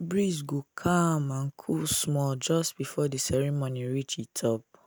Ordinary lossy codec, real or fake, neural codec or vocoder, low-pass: none; real; none; none